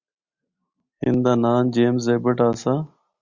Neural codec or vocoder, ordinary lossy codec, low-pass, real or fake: none; Opus, 64 kbps; 7.2 kHz; real